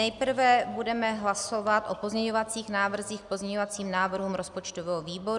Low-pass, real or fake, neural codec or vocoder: 10.8 kHz; real; none